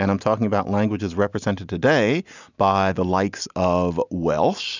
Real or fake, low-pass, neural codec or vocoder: real; 7.2 kHz; none